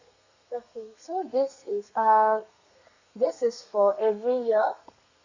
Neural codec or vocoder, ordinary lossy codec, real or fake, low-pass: codec, 32 kHz, 1.9 kbps, SNAC; Opus, 64 kbps; fake; 7.2 kHz